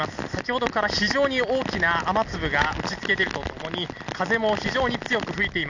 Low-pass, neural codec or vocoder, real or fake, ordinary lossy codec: 7.2 kHz; none; real; none